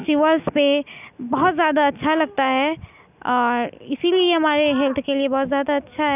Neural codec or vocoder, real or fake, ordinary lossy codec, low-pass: none; real; none; 3.6 kHz